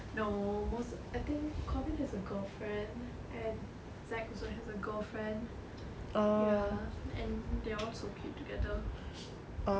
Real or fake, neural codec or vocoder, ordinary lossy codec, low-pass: real; none; none; none